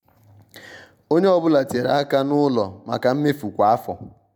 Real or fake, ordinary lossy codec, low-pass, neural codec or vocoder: real; none; none; none